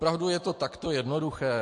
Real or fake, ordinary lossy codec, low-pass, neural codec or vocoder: real; MP3, 48 kbps; 10.8 kHz; none